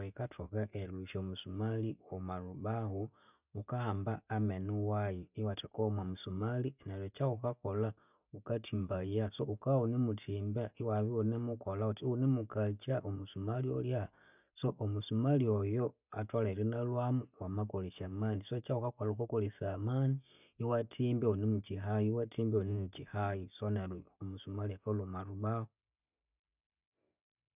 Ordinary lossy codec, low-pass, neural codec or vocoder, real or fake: none; 3.6 kHz; none; real